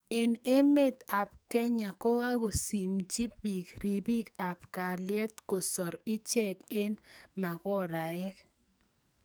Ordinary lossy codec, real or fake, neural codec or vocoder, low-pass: none; fake; codec, 44.1 kHz, 2.6 kbps, SNAC; none